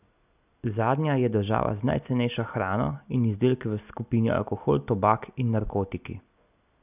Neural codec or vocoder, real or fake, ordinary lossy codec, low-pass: none; real; none; 3.6 kHz